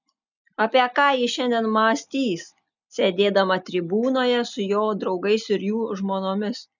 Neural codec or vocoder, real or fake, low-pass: none; real; 7.2 kHz